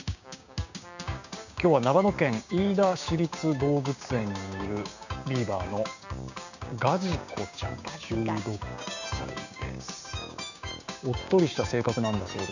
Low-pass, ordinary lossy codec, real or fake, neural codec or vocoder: 7.2 kHz; none; fake; codec, 16 kHz, 6 kbps, DAC